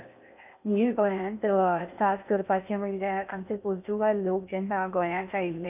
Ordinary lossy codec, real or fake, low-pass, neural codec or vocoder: none; fake; 3.6 kHz; codec, 16 kHz in and 24 kHz out, 0.6 kbps, FocalCodec, streaming, 4096 codes